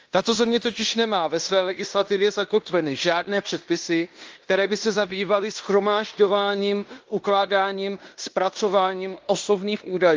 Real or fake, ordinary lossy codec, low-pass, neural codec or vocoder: fake; Opus, 24 kbps; 7.2 kHz; codec, 16 kHz in and 24 kHz out, 0.9 kbps, LongCat-Audio-Codec, fine tuned four codebook decoder